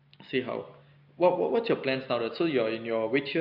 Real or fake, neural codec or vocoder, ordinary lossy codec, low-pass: real; none; none; 5.4 kHz